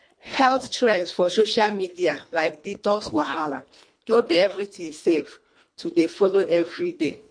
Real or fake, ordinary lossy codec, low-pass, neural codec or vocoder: fake; MP3, 48 kbps; 9.9 kHz; codec, 24 kHz, 1.5 kbps, HILCodec